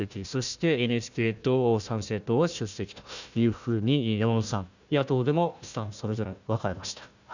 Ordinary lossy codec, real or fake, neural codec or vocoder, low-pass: none; fake; codec, 16 kHz, 1 kbps, FunCodec, trained on Chinese and English, 50 frames a second; 7.2 kHz